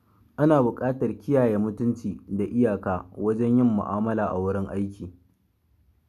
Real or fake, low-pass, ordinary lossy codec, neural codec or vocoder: real; 14.4 kHz; none; none